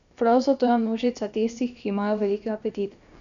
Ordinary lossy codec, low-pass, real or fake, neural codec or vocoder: none; 7.2 kHz; fake; codec, 16 kHz, about 1 kbps, DyCAST, with the encoder's durations